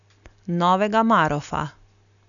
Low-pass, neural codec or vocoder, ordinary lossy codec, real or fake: 7.2 kHz; none; MP3, 96 kbps; real